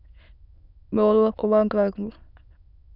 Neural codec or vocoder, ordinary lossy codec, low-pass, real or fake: autoencoder, 22.05 kHz, a latent of 192 numbers a frame, VITS, trained on many speakers; none; 5.4 kHz; fake